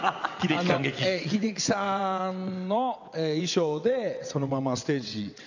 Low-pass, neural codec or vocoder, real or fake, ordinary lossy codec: 7.2 kHz; vocoder, 22.05 kHz, 80 mel bands, WaveNeXt; fake; none